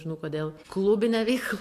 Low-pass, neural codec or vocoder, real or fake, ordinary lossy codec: 14.4 kHz; none; real; AAC, 64 kbps